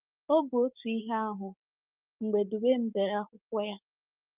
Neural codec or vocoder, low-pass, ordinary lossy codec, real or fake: codec, 16 kHz, 6 kbps, DAC; 3.6 kHz; Opus, 24 kbps; fake